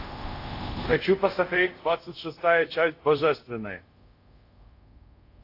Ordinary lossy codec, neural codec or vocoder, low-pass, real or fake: AAC, 32 kbps; codec, 24 kHz, 0.5 kbps, DualCodec; 5.4 kHz; fake